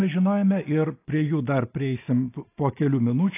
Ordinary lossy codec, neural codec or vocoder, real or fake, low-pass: AAC, 24 kbps; none; real; 3.6 kHz